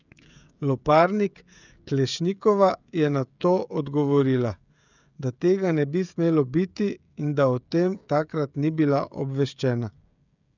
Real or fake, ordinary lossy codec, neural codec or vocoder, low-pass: fake; none; codec, 16 kHz, 16 kbps, FreqCodec, smaller model; 7.2 kHz